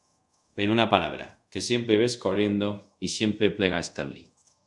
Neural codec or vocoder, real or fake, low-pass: codec, 24 kHz, 0.5 kbps, DualCodec; fake; 10.8 kHz